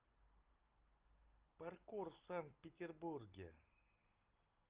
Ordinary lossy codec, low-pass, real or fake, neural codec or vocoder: Opus, 24 kbps; 3.6 kHz; real; none